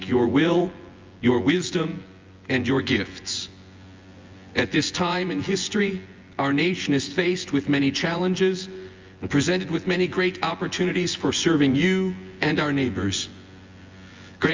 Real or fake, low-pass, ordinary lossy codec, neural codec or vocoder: fake; 7.2 kHz; Opus, 32 kbps; vocoder, 24 kHz, 100 mel bands, Vocos